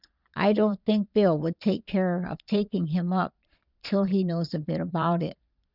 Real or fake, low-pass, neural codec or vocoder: fake; 5.4 kHz; vocoder, 22.05 kHz, 80 mel bands, Vocos